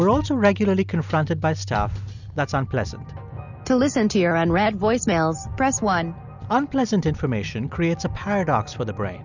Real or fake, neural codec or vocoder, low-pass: real; none; 7.2 kHz